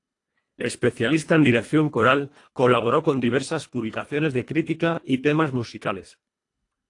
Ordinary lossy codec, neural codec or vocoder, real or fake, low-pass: AAC, 48 kbps; codec, 24 kHz, 1.5 kbps, HILCodec; fake; 10.8 kHz